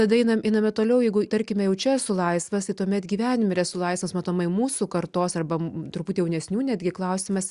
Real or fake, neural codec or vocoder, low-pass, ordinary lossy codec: real; none; 10.8 kHz; Opus, 64 kbps